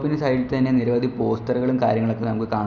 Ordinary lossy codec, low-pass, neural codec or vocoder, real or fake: none; none; none; real